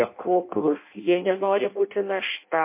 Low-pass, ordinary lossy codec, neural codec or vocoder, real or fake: 3.6 kHz; MP3, 24 kbps; codec, 16 kHz in and 24 kHz out, 0.6 kbps, FireRedTTS-2 codec; fake